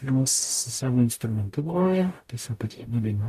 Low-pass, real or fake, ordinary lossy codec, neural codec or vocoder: 14.4 kHz; fake; AAC, 96 kbps; codec, 44.1 kHz, 0.9 kbps, DAC